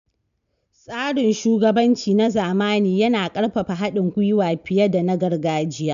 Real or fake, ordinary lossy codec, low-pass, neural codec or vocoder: real; none; 7.2 kHz; none